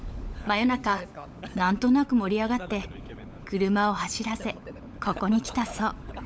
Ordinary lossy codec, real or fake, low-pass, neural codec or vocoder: none; fake; none; codec, 16 kHz, 16 kbps, FunCodec, trained on LibriTTS, 50 frames a second